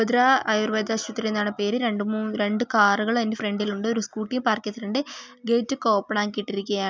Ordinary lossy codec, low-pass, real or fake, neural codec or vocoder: none; none; real; none